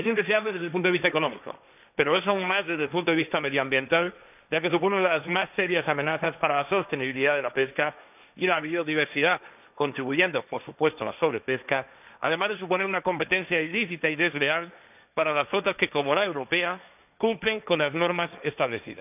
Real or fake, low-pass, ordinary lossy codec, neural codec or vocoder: fake; 3.6 kHz; none; codec, 16 kHz, 1.1 kbps, Voila-Tokenizer